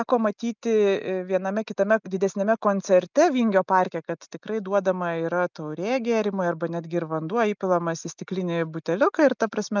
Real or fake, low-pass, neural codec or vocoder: real; 7.2 kHz; none